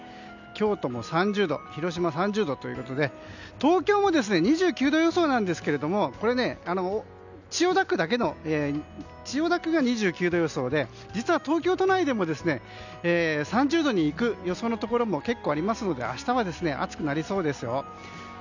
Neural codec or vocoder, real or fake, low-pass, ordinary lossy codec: none; real; 7.2 kHz; none